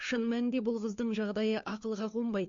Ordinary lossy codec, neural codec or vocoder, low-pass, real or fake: MP3, 48 kbps; codec, 16 kHz, 4 kbps, FunCodec, trained on LibriTTS, 50 frames a second; 7.2 kHz; fake